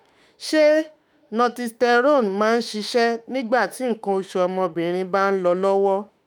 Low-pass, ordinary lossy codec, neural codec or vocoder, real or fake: none; none; autoencoder, 48 kHz, 32 numbers a frame, DAC-VAE, trained on Japanese speech; fake